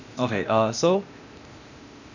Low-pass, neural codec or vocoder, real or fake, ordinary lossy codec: 7.2 kHz; codec, 16 kHz, 0.8 kbps, ZipCodec; fake; none